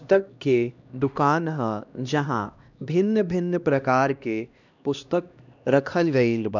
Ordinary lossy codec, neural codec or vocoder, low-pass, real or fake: none; codec, 16 kHz, 1 kbps, X-Codec, HuBERT features, trained on LibriSpeech; 7.2 kHz; fake